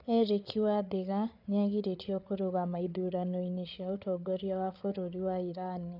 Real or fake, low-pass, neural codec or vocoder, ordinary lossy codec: fake; 5.4 kHz; codec, 16 kHz, 8 kbps, FreqCodec, larger model; none